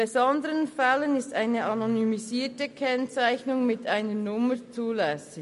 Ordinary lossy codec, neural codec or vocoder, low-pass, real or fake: MP3, 48 kbps; none; 14.4 kHz; real